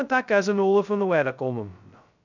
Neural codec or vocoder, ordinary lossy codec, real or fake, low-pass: codec, 16 kHz, 0.2 kbps, FocalCodec; none; fake; 7.2 kHz